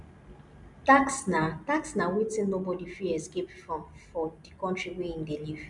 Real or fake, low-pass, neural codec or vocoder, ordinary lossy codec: real; 10.8 kHz; none; MP3, 96 kbps